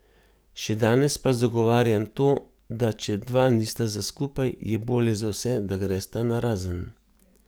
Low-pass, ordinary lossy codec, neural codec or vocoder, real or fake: none; none; codec, 44.1 kHz, 7.8 kbps, DAC; fake